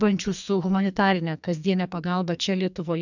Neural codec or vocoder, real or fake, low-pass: codec, 44.1 kHz, 2.6 kbps, SNAC; fake; 7.2 kHz